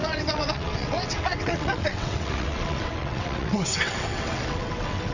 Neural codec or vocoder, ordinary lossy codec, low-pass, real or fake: vocoder, 22.05 kHz, 80 mel bands, WaveNeXt; none; 7.2 kHz; fake